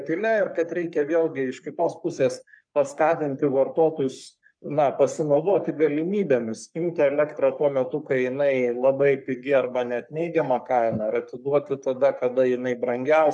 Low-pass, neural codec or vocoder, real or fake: 9.9 kHz; codec, 44.1 kHz, 3.4 kbps, Pupu-Codec; fake